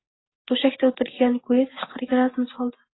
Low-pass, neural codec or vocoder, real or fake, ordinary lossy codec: 7.2 kHz; none; real; AAC, 16 kbps